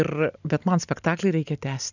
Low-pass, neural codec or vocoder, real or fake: 7.2 kHz; none; real